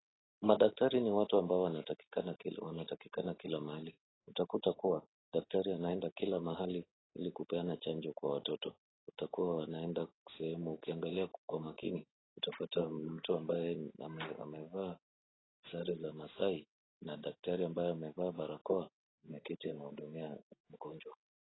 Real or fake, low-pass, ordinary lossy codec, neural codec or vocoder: real; 7.2 kHz; AAC, 16 kbps; none